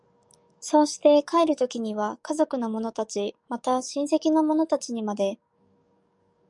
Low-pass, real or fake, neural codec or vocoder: 10.8 kHz; fake; codec, 44.1 kHz, 7.8 kbps, DAC